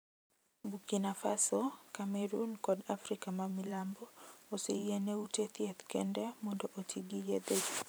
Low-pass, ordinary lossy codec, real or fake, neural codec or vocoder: none; none; fake; vocoder, 44.1 kHz, 128 mel bands every 512 samples, BigVGAN v2